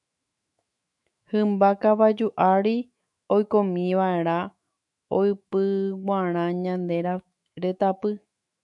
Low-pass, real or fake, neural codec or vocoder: 10.8 kHz; fake; autoencoder, 48 kHz, 128 numbers a frame, DAC-VAE, trained on Japanese speech